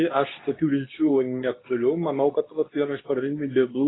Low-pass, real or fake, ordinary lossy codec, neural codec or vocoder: 7.2 kHz; fake; AAC, 16 kbps; codec, 24 kHz, 0.9 kbps, WavTokenizer, medium speech release version 2